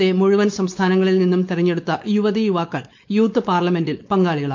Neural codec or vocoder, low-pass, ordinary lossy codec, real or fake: codec, 16 kHz, 4.8 kbps, FACodec; 7.2 kHz; MP3, 48 kbps; fake